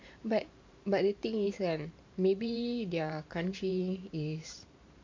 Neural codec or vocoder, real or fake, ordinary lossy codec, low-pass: vocoder, 44.1 kHz, 128 mel bands every 512 samples, BigVGAN v2; fake; MP3, 48 kbps; 7.2 kHz